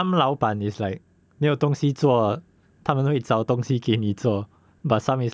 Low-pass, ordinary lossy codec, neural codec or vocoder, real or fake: none; none; none; real